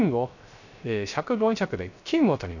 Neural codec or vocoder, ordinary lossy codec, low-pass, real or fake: codec, 16 kHz, 0.3 kbps, FocalCodec; none; 7.2 kHz; fake